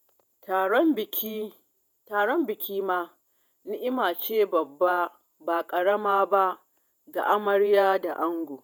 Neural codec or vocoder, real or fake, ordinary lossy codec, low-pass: vocoder, 48 kHz, 128 mel bands, Vocos; fake; none; 19.8 kHz